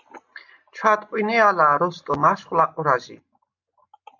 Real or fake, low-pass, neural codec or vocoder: real; 7.2 kHz; none